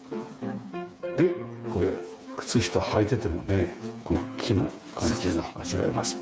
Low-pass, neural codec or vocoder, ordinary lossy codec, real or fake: none; codec, 16 kHz, 4 kbps, FreqCodec, smaller model; none; fake